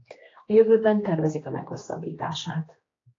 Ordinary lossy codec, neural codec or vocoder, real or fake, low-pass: AAC, 32 kbps; codec, 16 kHz, 2 kbps, X-Codec, HuBERT features, trained on general audio; fake; 7.2 kHz